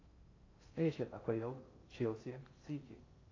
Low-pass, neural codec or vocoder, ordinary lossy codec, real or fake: 7.2 kHz; codec, 16 kHz in and 24 kHz out, 0.6 kbps, FocalCodec, streaming, 2048 codes; AAC, 32 kbps; fake